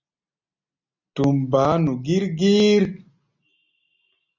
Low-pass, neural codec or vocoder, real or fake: 7.2 kHz; none; real